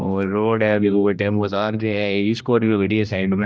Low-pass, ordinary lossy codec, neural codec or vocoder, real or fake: none; none; codec, 16 kHz, 1 kbps, X-Codec, HuBERT features, trained on general audio; fake